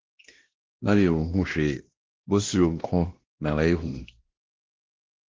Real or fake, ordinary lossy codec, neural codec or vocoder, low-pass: fake; Opus, 16 kbps; codec, 16 kHz, 1 kbps, X-Codec, WavLM features, trained on Multilingual LibriSpeech; 7.2 kHz